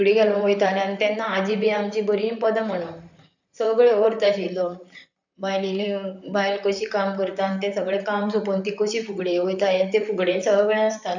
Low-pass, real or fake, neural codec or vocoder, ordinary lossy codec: 7.2 kHz; fake; vocoder, 44.1 kHz, 128 mel bands, Pupu-Vocoder; none